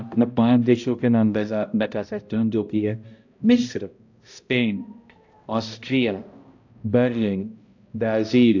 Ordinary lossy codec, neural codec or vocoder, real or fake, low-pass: AAC, 48 kbps; codec, 16 kHz, 0.5 kbps, X-Codec, HuBERT features, trained on balanced general audio; fake; 7.2 kHz